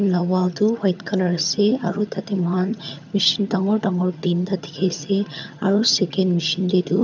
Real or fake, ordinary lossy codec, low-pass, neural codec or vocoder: fake; none; 7.2 kHz; vocoder, 22.05 kHz, 80 mel bands, HiFi-GAN